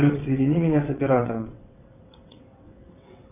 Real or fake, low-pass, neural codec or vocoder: fake; 3.6 kHz; vocoder, 22.05 kHz, 80 mel bands, WaveNeXt